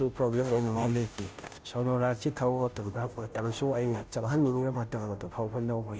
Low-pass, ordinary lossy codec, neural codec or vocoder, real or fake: none; none; codec, 16 kHz, 0.5 kbps, FunCodec, trained on Chinese and English, 25 frames a second; fake